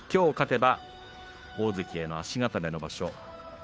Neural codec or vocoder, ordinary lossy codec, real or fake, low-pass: codec, 16 kHz, 2 kbps, FunCodec, trained on Chinese and English, 25 frames a second; none; fake; none